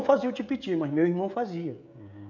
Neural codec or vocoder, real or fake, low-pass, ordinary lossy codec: codec, 16 kHz, 16 kbps, FreqCodec, smaller model; fake; 7.2 kHz; none